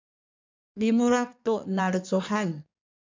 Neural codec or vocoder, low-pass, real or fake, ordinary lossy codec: codec, 16 kHz in and 24 kHz out, 1.1 kbps, FireRedTTS-2 codec; 7.2 kHz; fake; MP3, 64 kbps